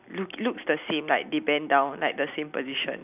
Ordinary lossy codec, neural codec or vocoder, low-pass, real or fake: none; vocoder, 44.1 kHz, 128 mel bands every 256 samples, BigVGAN v2; 3.6 kHz; fake